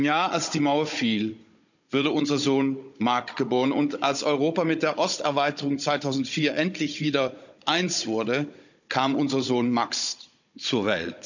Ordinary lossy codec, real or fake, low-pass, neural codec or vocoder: none; fake; 7.2 kHz; codec, 16 kHz, 16 kbps, FunCodec, trained on Chinese and English, 50 frames a second